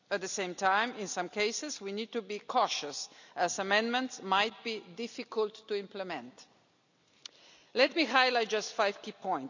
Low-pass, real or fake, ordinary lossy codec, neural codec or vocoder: 7.2 kHz; real; none; none